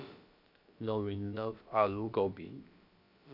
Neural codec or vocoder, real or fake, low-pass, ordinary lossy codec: codec, 16 kHz, about 1 kbps, DyCAST, with the encoder's durations; fake; 5.4 kHz; none